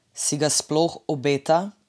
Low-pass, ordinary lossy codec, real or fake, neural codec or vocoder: none; none; real; none